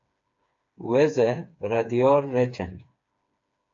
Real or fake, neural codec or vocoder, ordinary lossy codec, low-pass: fake; codec, 16 kHz, 4 kbps, FreqCodec, smaller model; AAC, 48 kbps; 7.2 kHz